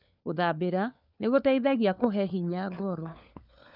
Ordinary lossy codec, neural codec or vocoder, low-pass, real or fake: none; codec, 16 kHz, 4 kbps, FunCodec, trained on LibriTTS, 50 frames a second; 5.4 kHz; fake